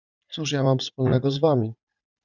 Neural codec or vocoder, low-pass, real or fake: vocoder, 44.1 kHz, 80 mel bands, Vocos; 7.2 kHz; fake